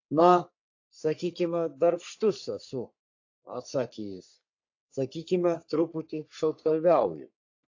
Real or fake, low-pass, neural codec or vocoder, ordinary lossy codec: fake; 7.2 kHz; codec, 44.1 kHz, 3.4 kbps, Pupu-Codec; AAC, 48 kbps